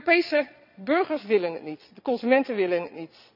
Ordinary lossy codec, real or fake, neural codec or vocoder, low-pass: none; fake; vocoder, 22.05 kHz, 80 mel bands, Vocos; 5.4 kHz